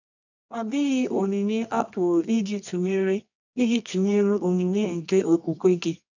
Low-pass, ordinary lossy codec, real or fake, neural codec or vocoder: 7.2 kHz; none; fake; codec, 24 kHz, 0.9 kbps, WavTokenizer, medium music audio release